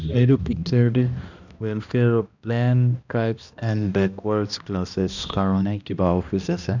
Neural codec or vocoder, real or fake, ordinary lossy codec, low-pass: codec, 16 kHz, 1 kbps, X-Codec, HuBERT features, trained on balanced general audio; fake; none; 7.2 kHz